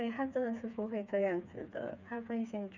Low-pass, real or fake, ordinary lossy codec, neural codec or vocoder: 7.2 kHz; fake; none; codec, 16 kHz, 4 kbps, FreqCodec, smaller model